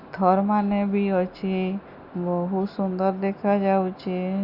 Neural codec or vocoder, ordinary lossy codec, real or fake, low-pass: none; Opus, 64 kbps; real; 5.4 kHz